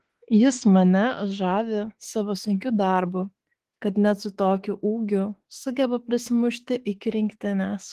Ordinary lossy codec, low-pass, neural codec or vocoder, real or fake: Opus, 16 kbps; 14.4 kHz; autoencoder, 48 kHz, 32 numbers a frame, DAC-VAE, trained on Japanese speech; fake